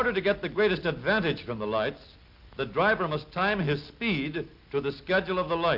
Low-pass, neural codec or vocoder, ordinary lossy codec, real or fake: 5.4 kHz; none; Opus, 24 kbps; real